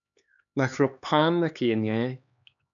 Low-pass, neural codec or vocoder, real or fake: 7.2 kHz; codec, 16 kHz, 2 kbps, X-Codec, HuBERT features, trained on LibriSpeech; fake